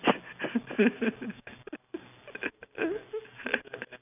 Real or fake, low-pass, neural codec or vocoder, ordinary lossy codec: real; 3.6 kHz; none; none